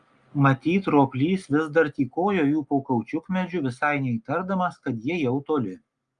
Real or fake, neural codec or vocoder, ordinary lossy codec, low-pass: real; none; Opus, 32 kbps; 9.9 kHz